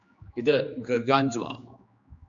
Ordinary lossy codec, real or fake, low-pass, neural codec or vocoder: AAC, 64 kbps; fake; 7.2 kHz; codec, 16 kHz, 2 kbps, X-Codec, HuBERT features, trained on general audio